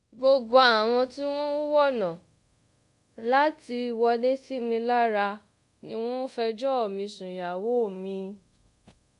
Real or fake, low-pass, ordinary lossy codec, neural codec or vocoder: fake; 10.8 kHz; AAC, 96 kbps; codec, 24 kHz, 0.5 kbps, DualCodec